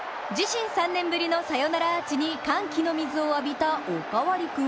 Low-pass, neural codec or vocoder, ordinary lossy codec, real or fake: none; none; none; real